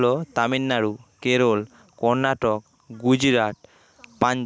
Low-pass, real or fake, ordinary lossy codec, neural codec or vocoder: none; real; none; none